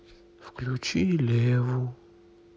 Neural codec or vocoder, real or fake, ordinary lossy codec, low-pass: none; real; none; none